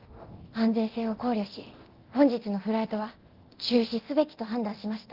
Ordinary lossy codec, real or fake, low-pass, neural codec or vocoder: Opus, 16 kbps; fake; 5.4 kHz; codec, 24 kHz, 0.9 kbps, DualCodec